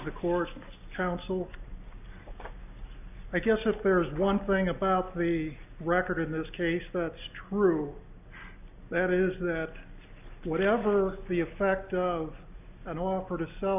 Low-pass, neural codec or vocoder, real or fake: 3.6 kHz; none; real